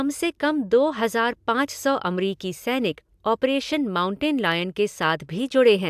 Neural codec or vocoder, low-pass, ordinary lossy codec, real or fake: none; 14.4 kHz; AAC, 96 kbps; real